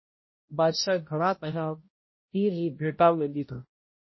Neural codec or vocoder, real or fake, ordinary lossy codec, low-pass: codec, 16 kHz, 0.5 kbps, X-Codec, HuBERT features, trained on balanced general audio; fake; MP3, 24 kbps; 7.2 kHz